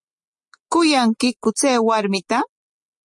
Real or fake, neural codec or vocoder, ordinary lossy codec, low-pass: real; none; MP3, 48 kbps; 10.8 kHz